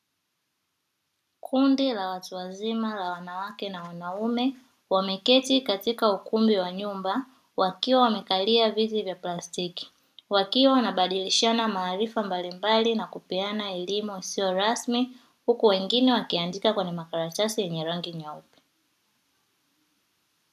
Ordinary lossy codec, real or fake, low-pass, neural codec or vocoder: MP3, 96 kbps; real; 14.4 kHz; none